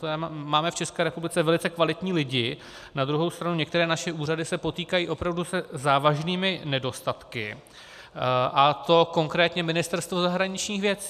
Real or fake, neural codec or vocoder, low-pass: real; none; 14.4 kHz